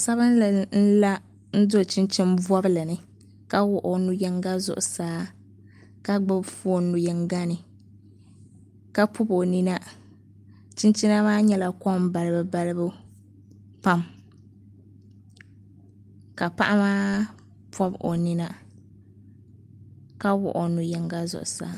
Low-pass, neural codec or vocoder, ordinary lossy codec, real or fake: 14.4 kHz; none; Opus, 32 kbps; real